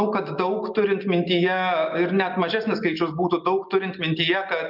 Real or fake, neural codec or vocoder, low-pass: real; none; 5.4 kHz